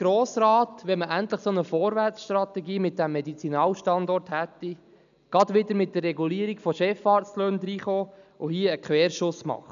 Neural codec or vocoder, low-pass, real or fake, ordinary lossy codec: none; 7.2 kHz; real; none